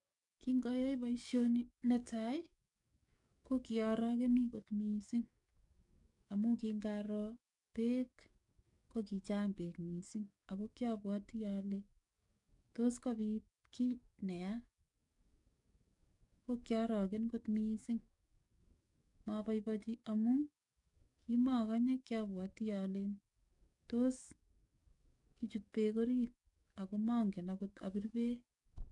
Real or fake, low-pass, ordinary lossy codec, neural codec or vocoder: fake; 10.8 kHz; none; codec, 44.1 kHz, 7.8 kbps, DAC